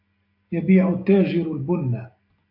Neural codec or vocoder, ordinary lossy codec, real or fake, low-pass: none; AAC, 32 kbps; real; 5.4 kHz